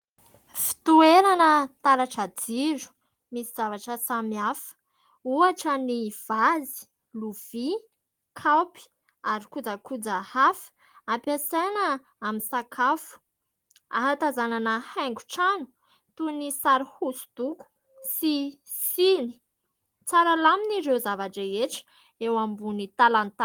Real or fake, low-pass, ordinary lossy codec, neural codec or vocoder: real; 19.8 kHz; Opus, 24 kbps; none